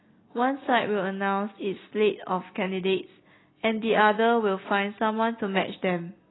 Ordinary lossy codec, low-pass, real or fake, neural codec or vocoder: AAC, 16 kbps; 7.2 kHz; real; none